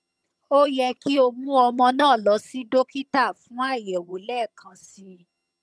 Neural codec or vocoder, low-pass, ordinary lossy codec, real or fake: vocoder, 22.05 kHz, 80 mel bands, HiFi-GAN; none; none; fake